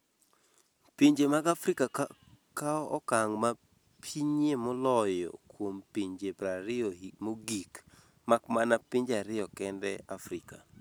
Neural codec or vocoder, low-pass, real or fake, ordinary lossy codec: none; none; real; none